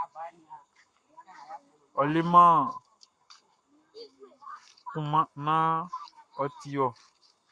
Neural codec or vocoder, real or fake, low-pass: codec, 44.1 kHz, 7.8 kbps, Pupu-Codec; fake; 9.9 kHz